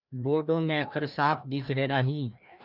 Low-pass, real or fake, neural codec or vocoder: 5.4 kHz; fake; codec, 16 kHz, 1 kbps, FreqCodec, larger model